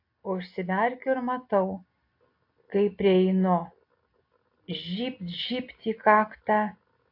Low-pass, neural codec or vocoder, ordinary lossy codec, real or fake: 5.4 kHz; none; AAC, 32 kbps; real